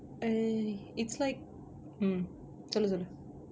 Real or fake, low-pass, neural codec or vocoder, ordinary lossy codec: real; none; none; none